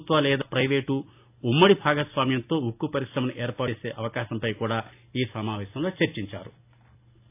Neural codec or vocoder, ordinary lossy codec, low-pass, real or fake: none; AAC, 32 kbps; 3.6 kHz; real